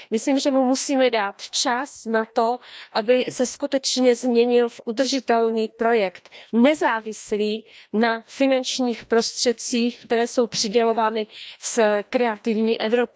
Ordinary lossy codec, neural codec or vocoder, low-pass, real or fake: none; codec, 16 kHz, 1 kbps, FreqCodec, larger model; none; fake